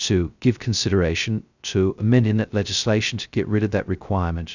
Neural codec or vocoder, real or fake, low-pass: codec, 16 kHz, 0.2 kbps, FocalCodec; fake; 7.2 kHz